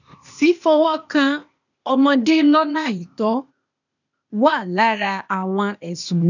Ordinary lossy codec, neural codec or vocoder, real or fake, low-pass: none; codec, 16 kHz, 0.8 kbps, ZipCodec; fake; 7.2 kHz